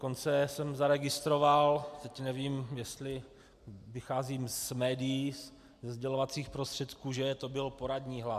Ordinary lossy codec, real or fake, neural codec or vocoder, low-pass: AAC, 96 kbps; real; none; 14.4 kHz